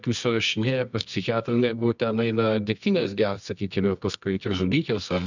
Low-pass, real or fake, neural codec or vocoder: 7.2 kHz; fake; codec, 24 kHz, 0.9 kbps, WavTokenizer, medium music audio release